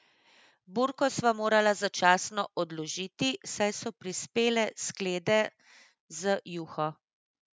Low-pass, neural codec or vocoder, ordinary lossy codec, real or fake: none; none; none; real